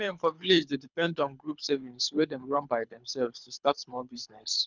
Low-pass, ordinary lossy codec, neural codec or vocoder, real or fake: 7.2 kHz; none; codec, 24 kHz, 3 kbps, HILCodec; fake